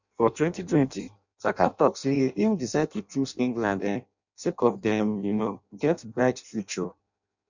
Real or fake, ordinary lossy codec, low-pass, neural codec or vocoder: fake; none; 7.2 kHz; codec, 16 kHz in and 24 kHz out, 0.6 kbps, FireRedTTS-2 codec